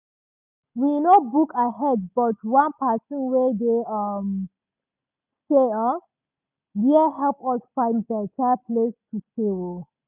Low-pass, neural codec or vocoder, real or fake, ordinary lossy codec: 3.6 kHz; none; real; none